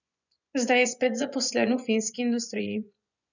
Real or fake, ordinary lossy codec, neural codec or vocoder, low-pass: fake; none; vocoder, 24 kHz, 100 mel bands, Vocos; 7.2 kHz